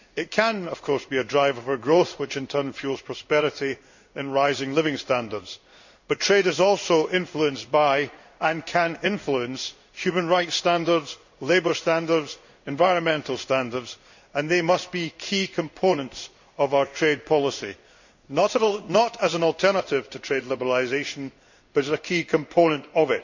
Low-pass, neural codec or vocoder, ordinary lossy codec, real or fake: 7.2 kHz; codec, 16 kHz in and 24 kHz out, 1 kbps, XY-Tokenizer; none; fake